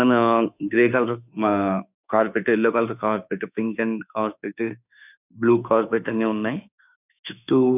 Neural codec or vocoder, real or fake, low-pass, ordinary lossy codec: autoencoder, 48 kHz, 32 numbers a frame, DAC-VAE, trained on Japanese speech; fake; 3.6 kHz; none